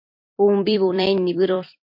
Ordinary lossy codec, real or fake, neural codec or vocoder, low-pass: MP3, 32 kbps; fake; vocoder, 44.1 kHz, 128 mel bands every 256 samples, BigVGAN v2; 5.4 kHz